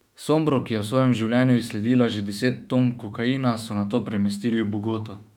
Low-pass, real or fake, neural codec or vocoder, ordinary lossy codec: 19.8 kHz; fake; autoencoder, 48 kHz, 32 numbers a frame, DAC-VAE, trained on Japanese speech; none